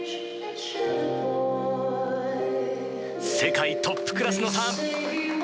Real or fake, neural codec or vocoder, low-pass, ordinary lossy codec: real; none; none; none